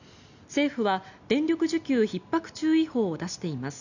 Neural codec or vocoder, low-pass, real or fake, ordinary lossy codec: none; 7.2 kHz; real; none